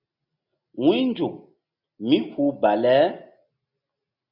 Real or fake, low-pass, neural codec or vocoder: real; 5.4 kHz; none